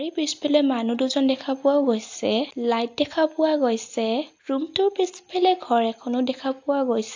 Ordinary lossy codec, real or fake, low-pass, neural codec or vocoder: AAC, 48 kbps; real; 7.2 kHz; none